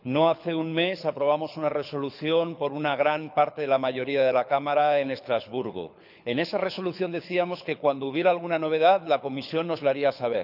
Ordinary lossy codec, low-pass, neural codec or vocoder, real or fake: none; 5.4 kHz; codec, 24 kHz, 6 kbps, HILCodec; fake